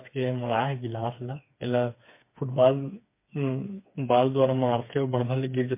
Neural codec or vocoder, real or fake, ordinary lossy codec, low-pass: codec, 16 kHz, 4 kbps, FreqCodec, smaller model; fake; MP3, 24 kbps; 3.6 kHz